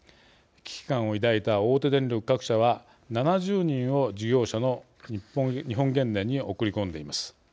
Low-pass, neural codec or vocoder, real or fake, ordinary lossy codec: none; none; real; none